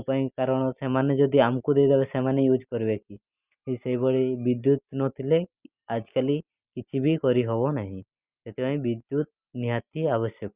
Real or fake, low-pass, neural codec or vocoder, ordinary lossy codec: real; 3.6 kHz; none; Opus, 64 kbps